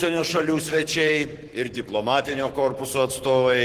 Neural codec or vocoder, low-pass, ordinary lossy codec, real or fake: codec, 44.1 kHz, 7.8 kbps, Pupu-Codec; 14.4 kHz; Opus, 16 kbps; fake